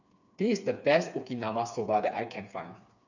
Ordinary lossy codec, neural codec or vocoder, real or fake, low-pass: none; codec, 16 kHz, 4 kbps, FreqCodec, smaller model; fake; 7.2 kHz